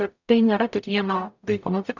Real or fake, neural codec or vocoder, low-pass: fake; codec, 44.1 kHz, 0.9 kbps, DAC; 7.2 kHz